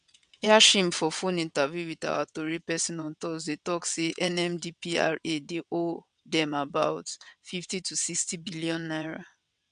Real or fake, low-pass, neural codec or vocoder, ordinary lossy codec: fake; 9.9 kHz; vocoder, 22.05 kHz, 80 mel bands, WaveNeXt; none